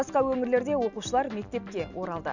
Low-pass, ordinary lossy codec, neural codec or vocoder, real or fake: 7.2 kHz; none; none; real